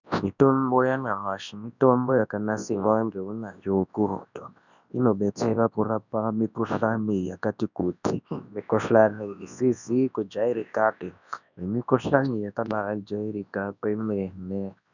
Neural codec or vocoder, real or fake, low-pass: codec, 24 kHz, 0.9 kbps, WavTokenizer, large speech release; fake; 7.2 kHz